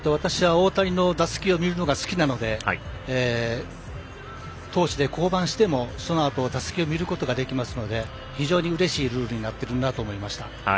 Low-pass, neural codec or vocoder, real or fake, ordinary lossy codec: none; none; real; none